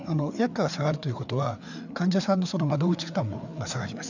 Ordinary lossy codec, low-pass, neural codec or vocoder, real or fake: none; 7.2 kHz; codec, 16 kHz, 4 kbps, FreqCodec, larger model; fake